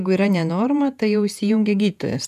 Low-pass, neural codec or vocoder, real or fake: 14.4 kHz; vocoder, 48 kHz, 128 mel bands, Vocos; fake